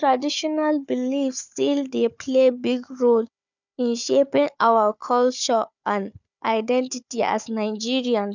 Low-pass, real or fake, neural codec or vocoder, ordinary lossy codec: 7.2 kHz; fake; codec, 16 kHz, 4 kbps, FunCodec, trained on Chinese and English, 50 frames a second; none